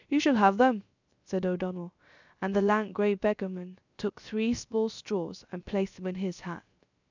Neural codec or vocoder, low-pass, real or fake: codec, 16 kHz, 0.3 kbps, FocalCodec; 7.2 kHz; fake